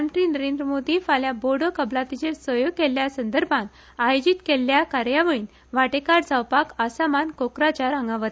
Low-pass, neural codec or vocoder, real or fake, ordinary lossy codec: none; none; real; none